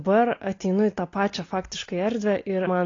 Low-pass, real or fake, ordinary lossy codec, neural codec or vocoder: 7.2 kHz; real; AAC, 32 kbps; none